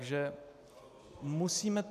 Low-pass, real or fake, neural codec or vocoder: 14.4 kHz; real; none